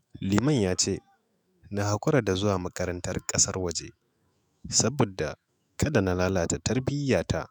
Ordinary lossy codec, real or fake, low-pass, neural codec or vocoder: none; fake; none; autoencoder, 48 kHz, 128 numbers a frame, DAC-VAE, trained on Japanese speech